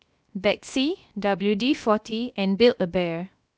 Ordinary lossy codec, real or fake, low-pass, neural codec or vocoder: none; fake; none; codec, 16 kHz, 0.7 kbps, FocalCodec